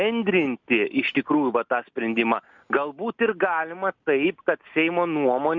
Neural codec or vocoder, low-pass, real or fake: none; 7.2 kHz; real